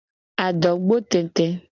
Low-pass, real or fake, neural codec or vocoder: 7.2 kHz; real; none